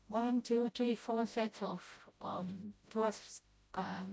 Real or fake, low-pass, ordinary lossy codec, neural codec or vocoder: fake; none; none; codec, 16 kHz, 0.5 kbps, FreqCodec, smaller model